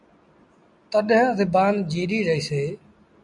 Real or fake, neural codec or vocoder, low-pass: real; none; 10.8 kHz